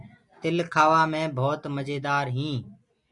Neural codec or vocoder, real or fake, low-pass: none; real; 10.8 kHz